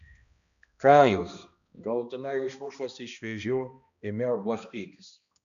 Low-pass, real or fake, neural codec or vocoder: 7.2 kHz; fake; codec, 16 kHz, 1 kbps, X-Codec, HuBERT features, trained on balanced general audio